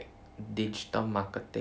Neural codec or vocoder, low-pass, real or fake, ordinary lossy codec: none; none; real; none